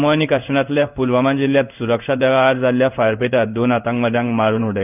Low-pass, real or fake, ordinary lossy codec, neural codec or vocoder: 3.6 kHz; fake; none; codec, 16 kHz in and 24 kHz out, 1 kbps, XY-Tokenizer